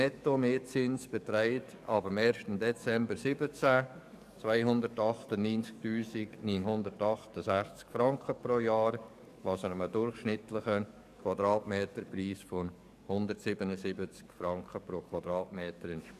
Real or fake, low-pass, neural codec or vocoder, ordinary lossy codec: fake; 14.4 kHz; codec, 44.1 kHz, 7.8 kbps, DAC; none